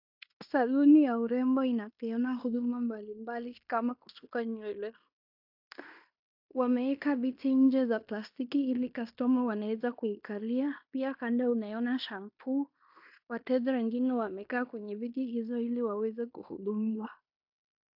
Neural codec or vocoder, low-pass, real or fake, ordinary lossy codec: codec, 16 kHz in and 24 kHz out, 0.9 kbps, LongCat-Audio-Codec, fine tuned four codebook decoder; 5.4 kHz; fake; AAC, 48 kbps